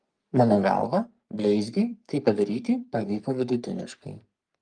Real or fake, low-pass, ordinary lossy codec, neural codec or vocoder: fake; 9.9 kHz; Opus, 32 kbps; codec, 44.1 kHz, 3.4 kbps, Pupu-Codec